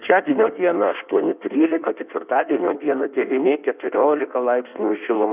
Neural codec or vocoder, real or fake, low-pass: codec, 16 kHz in and 24 kHz out, 1.1 kbps, FireRedTTS-2 codec; fake; 3.6 kHz